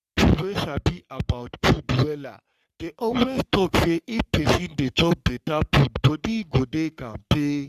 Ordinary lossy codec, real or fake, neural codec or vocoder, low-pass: Opus, 64 kbps; fake; codec, 44.1 kHz, 3.4 kbps, Pupu-Codec; 14.4 kHz